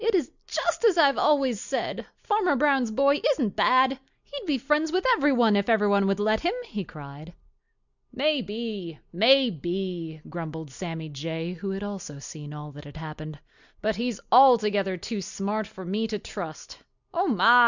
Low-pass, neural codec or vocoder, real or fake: 7.2 kHz; none; real